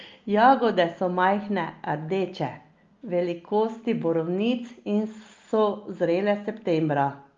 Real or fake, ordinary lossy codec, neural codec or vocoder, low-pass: real; Opus, 32 kbps; none; 7.2 kHz